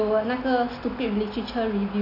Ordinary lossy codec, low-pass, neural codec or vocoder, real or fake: none; 5.4 kHz; none; real